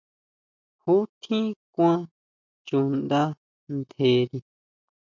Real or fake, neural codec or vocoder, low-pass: real; none; 7.2 kHz